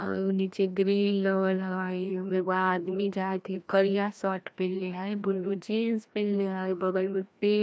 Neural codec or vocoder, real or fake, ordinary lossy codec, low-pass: codec, 16 kHz, 1 kbps, FreqCodec, larger model; fake; none; none